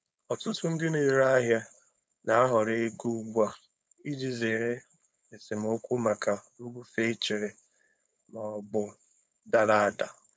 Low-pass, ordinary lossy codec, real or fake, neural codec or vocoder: none; none; fake; codec, 16 kHz, 4.8 kbps, FACodec